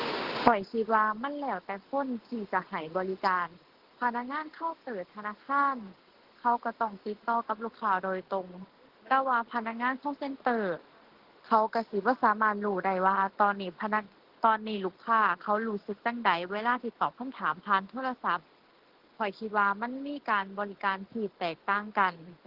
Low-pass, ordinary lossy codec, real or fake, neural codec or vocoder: 5.4 kHz; Opus, 16 kbps; real; none